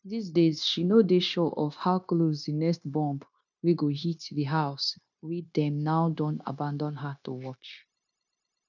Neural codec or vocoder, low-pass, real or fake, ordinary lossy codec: codec, 16 kHz, 0.9 kbps, LongCat-Audio-Codec; 7.2 kHz; fake; none